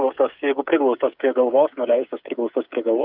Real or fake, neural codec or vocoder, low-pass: fake; codec, 16 kHz, 8 kbps, FreqCodec, smaller model; 5.4 kHz